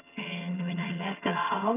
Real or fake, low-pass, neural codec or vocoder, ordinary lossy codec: fake; 3.6 kHz; vocoder, 22.05 kHz, 80 mel bands, HiFi-GAN; none